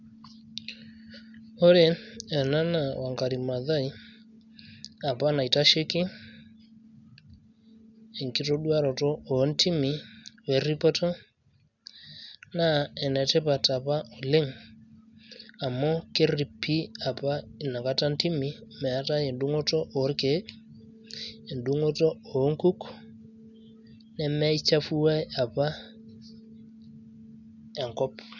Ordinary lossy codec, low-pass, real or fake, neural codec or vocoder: none; 7.2 kHz; real; none